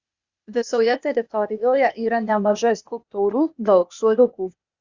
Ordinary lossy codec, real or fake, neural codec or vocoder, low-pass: Opus, 64 kbps; fake; codec, 16 kHz, 0.8 kbps, ZipCodec; 7.2 kHz